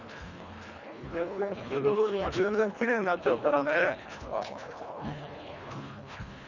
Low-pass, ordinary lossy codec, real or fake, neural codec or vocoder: 7.2 kHz; none; fake; codec, 24 kHz, 1.5 kbps, HILCodec